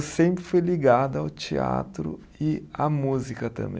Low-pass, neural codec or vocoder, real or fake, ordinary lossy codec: none; none; real; none